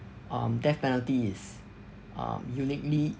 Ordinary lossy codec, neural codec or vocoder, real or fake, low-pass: none; none; real; none